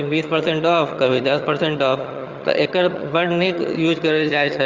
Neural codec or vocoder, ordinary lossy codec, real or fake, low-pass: vocoder, 22.05 kHz, 80 mel bands, HiFi-GAN; Opus, 32 kbps; fake; 7.2 kHz